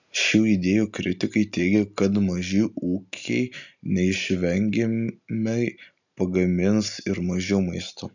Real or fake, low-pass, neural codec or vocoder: real; 7.2 kHz; none